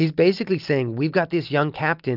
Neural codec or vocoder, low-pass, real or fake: none; 5.4 kHz; real